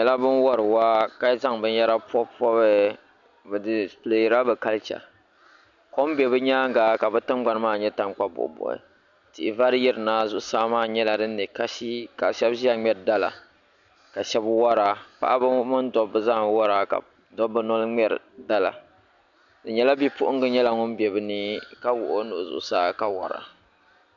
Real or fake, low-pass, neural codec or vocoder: real; 7.2 kHz; none